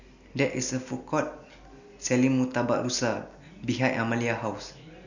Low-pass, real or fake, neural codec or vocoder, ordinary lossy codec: 7.2 kHz; real; none; none